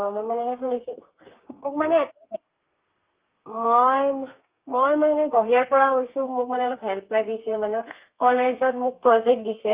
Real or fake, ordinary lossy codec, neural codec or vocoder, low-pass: fake; Opus, 32 kbps; codec, 32 kHz, 1.9 kbps, SNAC; 3.6 kHz